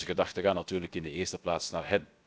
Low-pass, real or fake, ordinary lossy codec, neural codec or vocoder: none; fake; none; codec, 16 kHz, about 1 kbps, DyCAST, with the encoder's durations